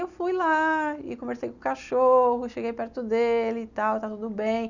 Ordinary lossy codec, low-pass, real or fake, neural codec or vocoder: none; 7.2 kHz; real; none